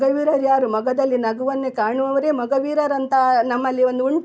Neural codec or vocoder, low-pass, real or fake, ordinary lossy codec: none; none; real; none